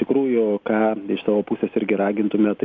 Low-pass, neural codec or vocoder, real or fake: 7.2 kHz; none; real